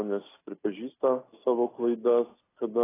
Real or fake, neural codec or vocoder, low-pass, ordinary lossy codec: real; none; 3.6 kHz; AAC, 16 kbps